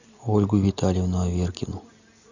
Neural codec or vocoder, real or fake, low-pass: none; real; 7.2 kHz